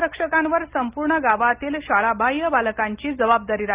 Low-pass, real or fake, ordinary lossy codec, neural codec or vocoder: 3.6 kHz; real; Opus, 32 kbps; none